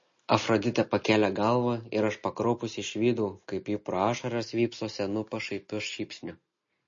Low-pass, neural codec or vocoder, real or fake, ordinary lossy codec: 7.2 kHz; none; real; MP3, 32 kbps